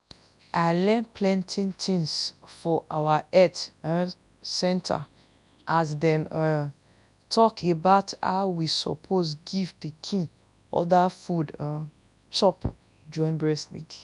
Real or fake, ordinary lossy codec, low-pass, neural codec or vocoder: fake; none; 10.8 kHz; codec, 24 kHz, 0.9 kbps, WavTokenizer, large speech release